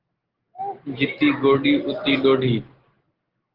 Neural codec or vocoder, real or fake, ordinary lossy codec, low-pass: none; real; Opus, 16 kbps; 5.4 kHz